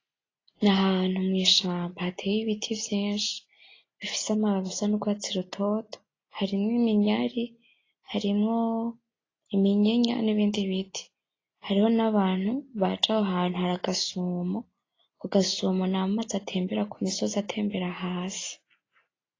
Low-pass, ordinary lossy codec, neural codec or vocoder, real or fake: 7.2 kHz; AAC, 32 kbps; none; real